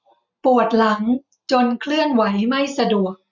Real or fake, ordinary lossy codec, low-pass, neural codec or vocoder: real; none; 7.2 kHz; none